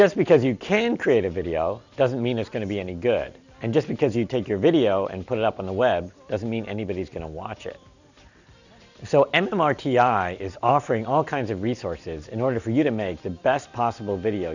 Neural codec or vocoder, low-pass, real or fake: none; 7.2 kHz; real